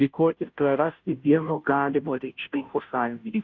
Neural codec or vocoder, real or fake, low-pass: codec, 16 kHz, 0.5 kbps, FunCodec, trained on Chinese and English, 25 frames a second; fake; 7.2 kHz